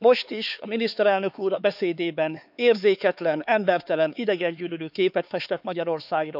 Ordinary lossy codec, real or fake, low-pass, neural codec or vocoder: none; fake; 5.4 kHz; codec, 16 kHz, 4 kbps, X-Codec, HuBERT features, trained on LibriSpeech